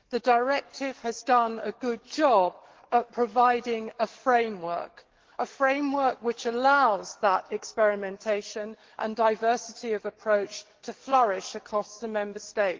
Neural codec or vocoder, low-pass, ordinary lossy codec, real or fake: codec, 44.1 kHz, 7.8 kbps, DAC; 7.2 kHz; Opus, 16 kbps; fake